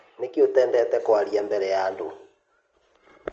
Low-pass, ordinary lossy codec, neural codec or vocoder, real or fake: 7.2 kHz; Opus, 24 kbps; none; real